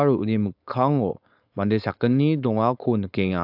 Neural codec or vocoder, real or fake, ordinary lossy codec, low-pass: codec, 16 kHz, 4.8 kbps, FACodec; fake; AAC, 48 kbps; 5.4 kHz